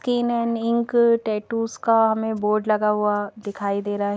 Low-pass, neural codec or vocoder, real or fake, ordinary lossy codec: none; none; real; none